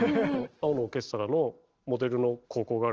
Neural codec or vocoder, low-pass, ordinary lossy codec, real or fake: none; 7.2 kHz; Opus, 16 kbps; real